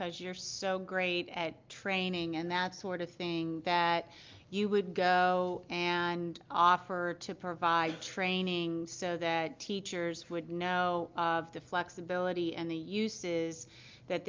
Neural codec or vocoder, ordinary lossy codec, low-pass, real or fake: none; Opus, 24 kbps; 7.2 kHz; real